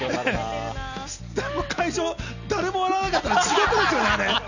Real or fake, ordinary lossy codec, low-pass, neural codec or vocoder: real; none; 7.2 kHz; none